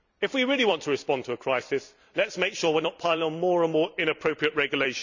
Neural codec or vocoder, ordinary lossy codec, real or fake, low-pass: vocoder, 44.1 kHz, 128 mel bands every 256 samples, BigVGAN v2; none; fake; 7.2 kHz